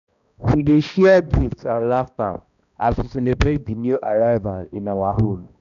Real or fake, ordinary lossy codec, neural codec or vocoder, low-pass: fake; none; codec, 16 kHz, 1 kbps, X-Codec, HuBERT features, trained on balanced general audio; 7.2 kHz